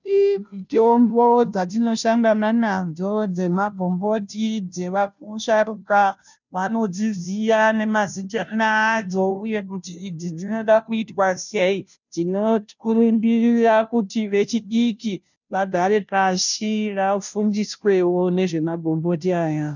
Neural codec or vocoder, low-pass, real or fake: codec, 16 kHz, 0.5 kbps, FunCodec, trained on Chinese and English, 25 frames a second; 7.2 kHz; fake